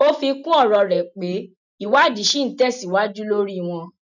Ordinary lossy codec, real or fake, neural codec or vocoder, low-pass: none; real; none; 7.2 kHz